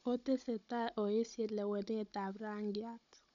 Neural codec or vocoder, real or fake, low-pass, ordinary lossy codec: codec, 16 kHz, 16 kbps, FunCodec, trained on Chinese and English, 50 frames a second; fake; 7.2 kHz; none